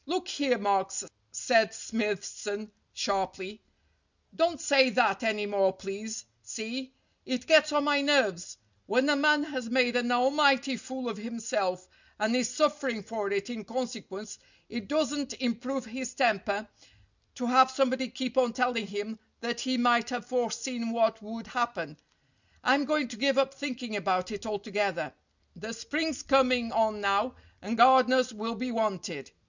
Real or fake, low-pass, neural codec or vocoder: real; 7.2 kHz; none